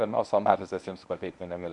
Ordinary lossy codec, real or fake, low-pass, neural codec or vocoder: MP3, 64 kbps; fake; 10.8 kHz; codec, 24 kHz, 0.9 kbps, WavTokenizer, medium speech release version 1